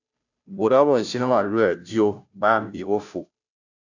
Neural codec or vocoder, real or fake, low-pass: codec, 16 kHz, 0.5 kbps, FunCodec, trained on Chinese and English, 25 frames a second; fake; 7.2 kHz